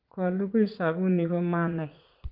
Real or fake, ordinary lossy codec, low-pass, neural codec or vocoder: fake; Opus, 24 kbps; 5.4 kHz; vocoder, 44.1 kHz, 80 mel bands, Vocos